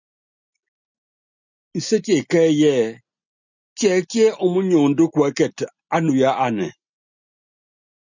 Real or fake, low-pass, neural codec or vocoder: real; 7.2 kHz; none